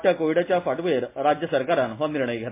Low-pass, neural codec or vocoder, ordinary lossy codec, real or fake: 3.6 kHz; none; MP3, 24 kbps; real